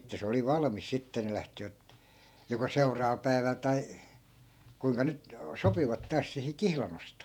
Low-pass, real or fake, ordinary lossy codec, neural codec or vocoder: 19.8 kHz; fake; none; vocoder, 48 kHz, 128 mel bands, Vocos